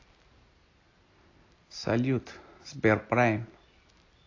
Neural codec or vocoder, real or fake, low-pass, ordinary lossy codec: none; real; 7.2 kHz; AAC, 32 kbps